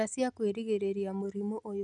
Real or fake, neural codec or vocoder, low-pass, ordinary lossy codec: real; none; 10.8 kHz; none